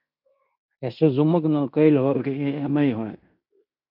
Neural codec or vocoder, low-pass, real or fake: codec, 16 kHz in and 24 kHz out, 0.9 kbps, LongCat-Audio-Codec, fine tuned four codebook decoder; 5.4 kHz; fake